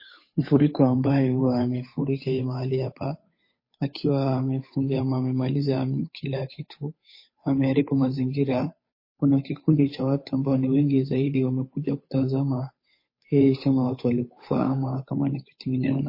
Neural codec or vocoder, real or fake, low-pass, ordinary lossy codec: codec, 16 kHz, 8 kbps, FunCodec, trained on Chinese and English, 25 frames a second; fake; 5.4 kHz; MP3, 24 kbps